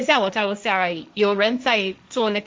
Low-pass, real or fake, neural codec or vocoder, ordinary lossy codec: none; fake; codec, 16 kHz, 1.1 kbps, Voila-Tokenizer; none